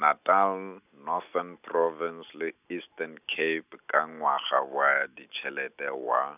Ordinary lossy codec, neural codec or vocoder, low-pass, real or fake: none; none; 3.6 kHz; real